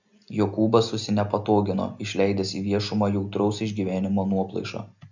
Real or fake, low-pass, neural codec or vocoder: real; 7.2 kHz; none